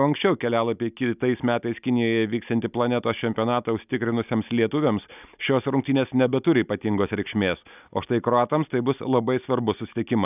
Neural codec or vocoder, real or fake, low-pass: none; real; 3.6 kHz